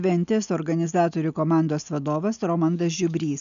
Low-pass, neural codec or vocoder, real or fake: 7.2 kHz; none; real